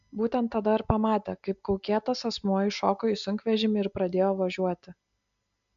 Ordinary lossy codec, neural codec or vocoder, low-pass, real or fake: MP3, 64 kbps; none; 7.2 kHz; real